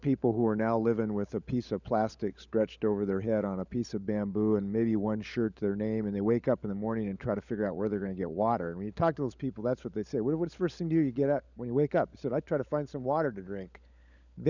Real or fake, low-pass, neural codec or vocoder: fake; 7.2 kHz; codec, 16 kHz, 16 kbps, FunCodec, trained on LibriTTS, 50 frames a second